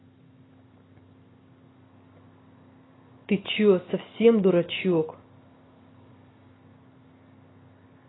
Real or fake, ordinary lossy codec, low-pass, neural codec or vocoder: real; AAC, 16 kbps; 7.2 kHz; none